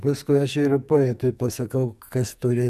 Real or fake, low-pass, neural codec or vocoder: fake; 14.4 kHz; codec, 44.1 kHz, 2.6 kbps, SNAC